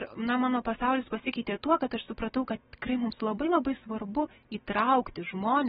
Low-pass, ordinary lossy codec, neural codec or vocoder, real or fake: 19.8 kHz; AAC, 16 kbps; none; real